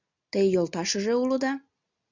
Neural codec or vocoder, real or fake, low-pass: none; real; 7.2 kHz